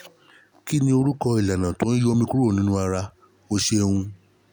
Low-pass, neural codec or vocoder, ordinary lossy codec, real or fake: none; none; none; real